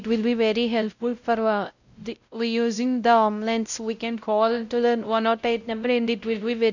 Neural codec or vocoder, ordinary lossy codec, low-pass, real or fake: codec, 16 kHz, 0.5 kbps, X-Codec, WavLM features, trained on Multilingual LibriSpeech; none; 7.2 kHz; fake